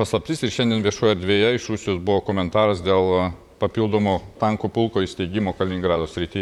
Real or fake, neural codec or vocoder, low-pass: fake; vocoder, 44.1 kHz, 128 mel bands, Pupu-Vocoder; 19.8 kHz